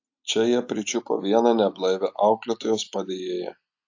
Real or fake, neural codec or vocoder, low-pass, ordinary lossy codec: real; none; 7.2 kHz; AAC, 48 kbps